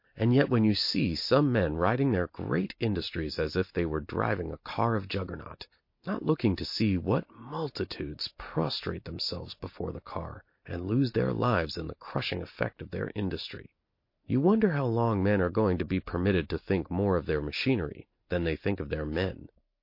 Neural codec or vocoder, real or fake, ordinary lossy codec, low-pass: none; real; MP3, 32 kbps; 5.4 kHz